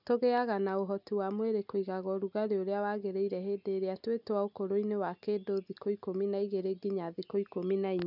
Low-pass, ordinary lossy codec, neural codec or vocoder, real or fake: 5.4 kHz; none; none; real